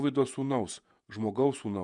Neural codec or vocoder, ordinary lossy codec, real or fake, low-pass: vocoder, 48 kHz, 128 mel bands, Vocos; MP3, 96 kbps; fake; 10.8 kHz